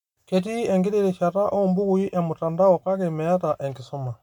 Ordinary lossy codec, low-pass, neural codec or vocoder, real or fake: MP3, 96 kbps; 19.8 kHz; none; real